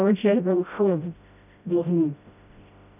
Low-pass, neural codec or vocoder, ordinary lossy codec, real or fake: 3.6 kHz; codec, 16 kHz, 0.5 kbps, FreqCodec, smaller model; none; fake